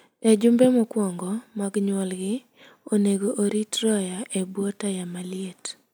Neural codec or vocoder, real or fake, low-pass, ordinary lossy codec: none; real; none; none